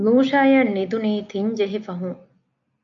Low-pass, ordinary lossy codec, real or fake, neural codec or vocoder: 7.2 kHz; MP3, 64 kbps; real; none